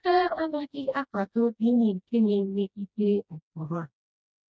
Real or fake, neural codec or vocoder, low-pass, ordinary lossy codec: fake; codec, 16 kHz, 1 kbps, FreqCodec, smaller model; none; none